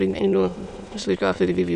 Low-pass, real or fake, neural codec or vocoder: 9.9 kHz; fake; autoencoder, 22.05 kHz, a latent of 192 numbers a frame, VITS, trained on many speakers